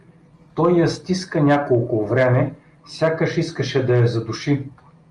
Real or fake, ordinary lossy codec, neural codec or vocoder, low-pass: real; Opus, 32 kbps; none; 10.8 kHz